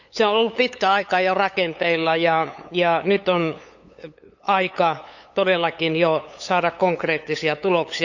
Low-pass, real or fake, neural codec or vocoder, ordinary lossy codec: 7.2 kHz; fake; codec, 16 kHz, 8 kbps, FunCodec, trained on LibriTTS, 25 frames a second; none